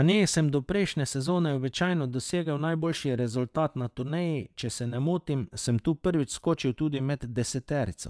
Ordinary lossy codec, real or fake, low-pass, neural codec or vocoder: none; fake; none; vocoder, 22.05 kHz, 80 mel bands, WaveNeXt